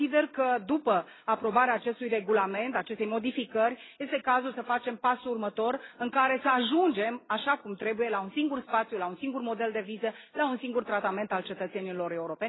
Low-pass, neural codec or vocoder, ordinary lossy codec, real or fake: 7.2 kHz; none; AAC, 16 kbps; real